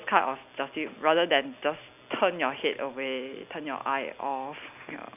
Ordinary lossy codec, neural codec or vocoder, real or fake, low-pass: none; none; real; 3.6 kHz